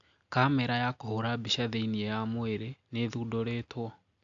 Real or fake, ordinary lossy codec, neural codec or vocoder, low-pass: real; AAC, 64 kbps; none; 7.2 kHz